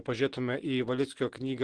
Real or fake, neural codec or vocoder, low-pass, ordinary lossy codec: real; none; 9.9 kHz; Opus, 16 kbps